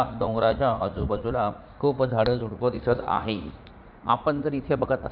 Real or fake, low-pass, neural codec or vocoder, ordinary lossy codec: fake; 5.4 kHz; vocoder, 44.1 kHz, 80 mel bands, Vocos; none